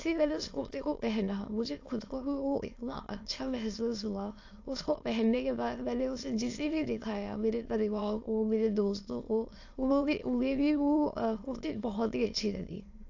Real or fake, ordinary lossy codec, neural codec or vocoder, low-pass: fake; AAC, 48 kbps; autoencoder, 22.05 kHz, a latent of 192 numbers a frame, VITS, trained on many speakers; 7.2 kHz